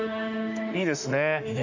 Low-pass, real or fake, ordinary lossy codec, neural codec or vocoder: 7.2 kHz; fake; none; autoencoder, 48 kHz, 32 numbers a frame, DAC-VAE, trained on Japanese speech